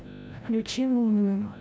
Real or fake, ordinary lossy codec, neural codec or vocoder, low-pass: fake; none; codec, 16 kHz, 0.5 kbps, FreqCodec, larger model; none